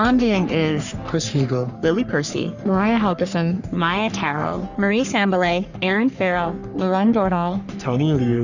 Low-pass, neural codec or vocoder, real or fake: 7.2 kHz; codec, 44.1 kHz, 3.4 kbps, Pupu-Codec; fake